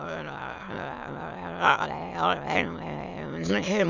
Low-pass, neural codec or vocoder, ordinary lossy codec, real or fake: 7.2 kHz; autoencoder, 22.05 kHz, a latent of 192 numbers a frame, VITS, trained on many speakers; none; fake